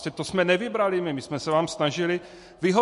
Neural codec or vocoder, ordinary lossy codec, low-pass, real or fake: none; MP3, 48 kbps; 14.4 kHz; real